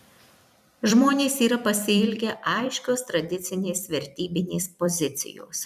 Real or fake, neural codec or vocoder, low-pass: fake; vocoder, 44.1 kHz, 128 mel bands every 256 samples, BigVGAN v2; 14.4 kHz